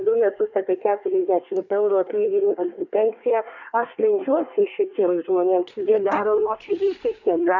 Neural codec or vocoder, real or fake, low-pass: codec, 24 kHz, 1 kbps, SNAC; fake; 7.2 kHz